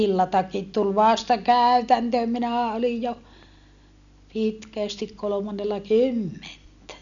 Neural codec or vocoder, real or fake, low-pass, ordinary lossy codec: none; real; 7.2 kHz; none